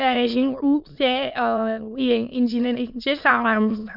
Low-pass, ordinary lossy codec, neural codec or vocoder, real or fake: 5.4 kHz; none; autoencoder, 22.05 kHz, a latent of 192 numbers a frame, VITS, trained on many speakers; fake